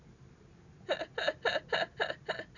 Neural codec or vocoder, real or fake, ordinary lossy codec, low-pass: none; real; none; 7.2 kHz